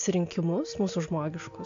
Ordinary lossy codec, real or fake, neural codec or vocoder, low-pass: AAC, 48 kbps; real; none; 7.2 kHz